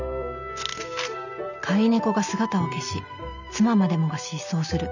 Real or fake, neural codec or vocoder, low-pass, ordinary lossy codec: real; none; 7.2 kHz; none